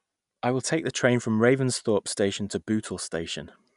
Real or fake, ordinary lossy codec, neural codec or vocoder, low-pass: real; none; none; 10.8 kHz